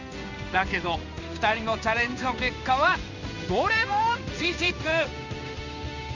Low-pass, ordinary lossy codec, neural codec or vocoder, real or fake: 7.2 kHz; none; codec, 16 kHz in and 24 kHz out, 1 kbps, XY-Tokenizer; fake